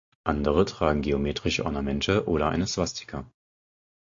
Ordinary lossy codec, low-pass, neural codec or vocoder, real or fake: AAC, 48 kbps; 7.2 kHz; none; real